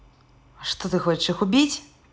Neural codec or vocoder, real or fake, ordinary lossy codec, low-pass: none; real; none; none